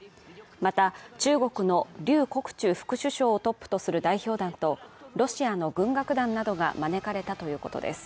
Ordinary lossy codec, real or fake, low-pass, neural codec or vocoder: none; real; none; none